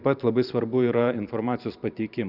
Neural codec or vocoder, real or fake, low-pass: vocoder, 44.1 kHz, 128 mel bands every 512 samples, BigVGAN v2; fake; 5.4 kHz